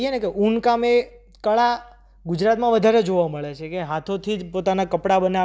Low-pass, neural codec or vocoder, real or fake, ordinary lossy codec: none; none; real; none